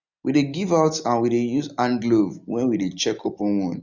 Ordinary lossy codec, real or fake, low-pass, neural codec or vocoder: none; real; 7.2 kHz; none